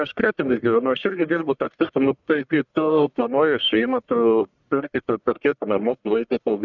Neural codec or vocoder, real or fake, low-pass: codec, 44.1 kHz, 1.7 kbps, Pupu-Codec; fake; 7.2 kHz